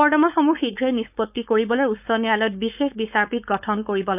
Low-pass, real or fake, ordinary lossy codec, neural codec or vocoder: 3.6 kHz; fake; none; codec, 16 kHz, 4.8 kbps, FACodec